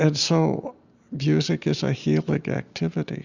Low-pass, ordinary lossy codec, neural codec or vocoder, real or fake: 7.2 kHz; Opus, 64 kbps; none; real